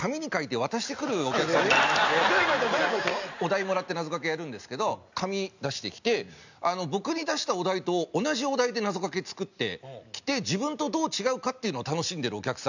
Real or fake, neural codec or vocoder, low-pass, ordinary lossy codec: real; none; 7.2 kHz; none